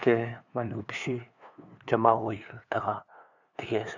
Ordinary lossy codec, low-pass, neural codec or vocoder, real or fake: none; 7.2 kHz; codec, 16 kHz, 2 kbps, FunCodec, trained on LibriTTS, 25 frames a second; fake